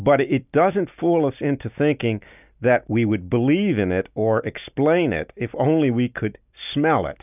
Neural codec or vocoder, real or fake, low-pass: none; real; 3.6 kHz